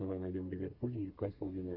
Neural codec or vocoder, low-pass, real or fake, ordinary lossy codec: codec, 32 kHz, 1.9 kbps, SNAC; 5.4 kHz; fake; Opus, 24 kbps